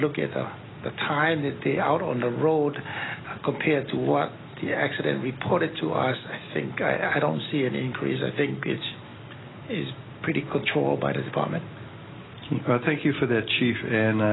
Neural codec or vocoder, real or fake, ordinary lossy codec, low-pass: none; real; AAC, 16 kbps; 7.2 kHz